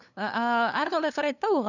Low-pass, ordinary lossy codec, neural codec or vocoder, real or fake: 7.2 kHz; none; codec, 16 kHz, 2 kbps, FunCodec, trained on LibriTTS, 25 frames a second; fake